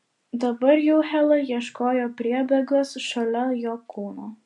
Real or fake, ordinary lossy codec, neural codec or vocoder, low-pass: real; MP3, 64 kbps; none; 10.8 kHz